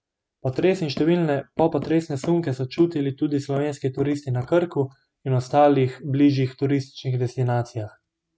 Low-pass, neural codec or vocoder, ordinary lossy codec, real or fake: none; none; none; real